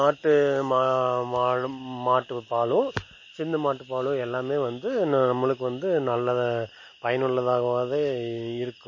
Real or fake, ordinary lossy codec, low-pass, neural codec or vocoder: real; MP3, 32 kbps; 7.2 kHz; none